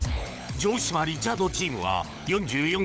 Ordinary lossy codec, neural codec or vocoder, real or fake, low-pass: none; codec, 16 kHz, 16 kbps, FunCodec, trained on LibriTTS, 50 frames a second; fake; none